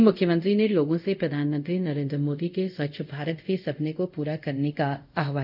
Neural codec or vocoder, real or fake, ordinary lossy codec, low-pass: codec, 24 kHz, 0.5 kbps, DualCodec; fake; none; 5.4 kHz